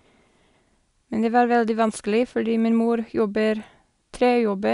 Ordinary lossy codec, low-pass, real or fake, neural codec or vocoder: none; 10.8 kHz; real; none